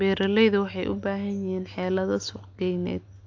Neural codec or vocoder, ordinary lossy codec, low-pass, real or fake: none; AAC, 48 kbps; 7.2 kHz; real